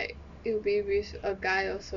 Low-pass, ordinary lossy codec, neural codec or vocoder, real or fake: 7.2 kHz; none; none; real